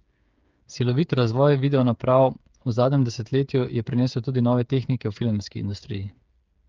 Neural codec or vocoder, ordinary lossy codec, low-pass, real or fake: codec, 16 kHz, 8 kbps, FreqCodec, smaller model; Opus, 24 kbps; 7.2 kHz; fake